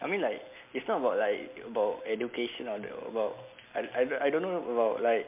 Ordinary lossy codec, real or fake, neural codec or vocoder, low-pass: MP3, 32 kbps; real; none; 3.6 kHz